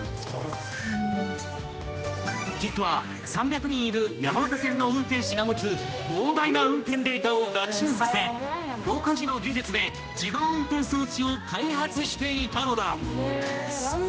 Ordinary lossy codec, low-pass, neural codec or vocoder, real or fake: none; none; codec, 16 kHz, 1 kbps, X-Codec, HuBERT features, trained on general audio; fake